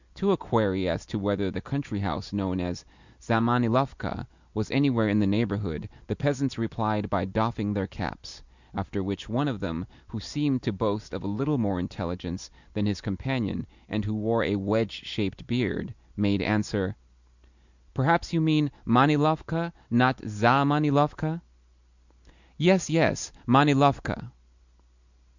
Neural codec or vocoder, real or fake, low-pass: none; real; 7.2 kHz